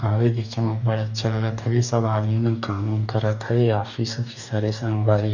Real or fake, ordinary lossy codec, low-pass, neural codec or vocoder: fake; none; 7.2 kHz; codec, 44.1 kHz, 2.6 kbps, DAC